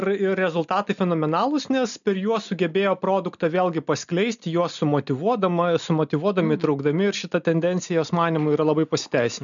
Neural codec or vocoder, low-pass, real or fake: none; 7.2 kHz; real